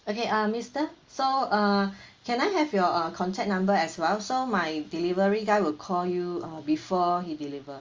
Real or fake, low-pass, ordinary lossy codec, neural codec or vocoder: real; 7.2 kHz; Opus, 32 kbps; none